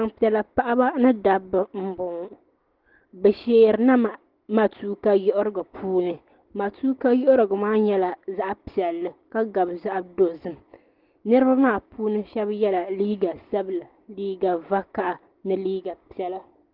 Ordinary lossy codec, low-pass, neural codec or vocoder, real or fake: Opus, 16 kbps; 5.4 kHz; none; real